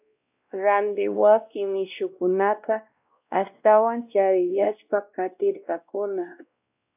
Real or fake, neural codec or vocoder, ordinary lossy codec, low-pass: fake; codec, 16 kHz, 1 kbps, X-Codec, WavLM features, trained on Multilingual LibriSpeech; AAC, 32 kbps; 3.6 kHz